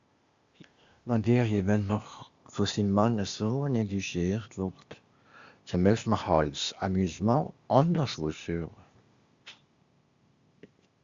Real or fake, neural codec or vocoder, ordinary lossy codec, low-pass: fake; codec, 16 kHz, 0.8 kbps, ZipCodec; Opus, 64 kbps; 7.2 kHz